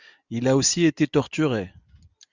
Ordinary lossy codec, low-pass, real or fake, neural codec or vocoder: Opus, 64 kbps; 7.2 kHz; real; none